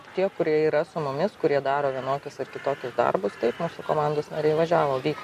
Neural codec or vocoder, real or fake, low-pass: none; real; 14.4 kHz